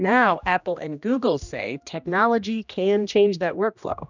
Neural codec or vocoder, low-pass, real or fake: codec, 16 kHz, 1 kbps, X-Codec, HuBERT features, trained on general audio; 7.2 kHz; fake